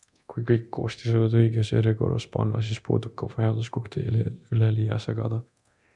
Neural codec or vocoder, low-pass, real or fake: codec, 24 kHz, 0.9 kbps, DualCodec; 10.8 kHz; fake